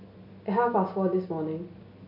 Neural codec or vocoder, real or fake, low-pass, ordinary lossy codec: none; real; 5.4 kHz; none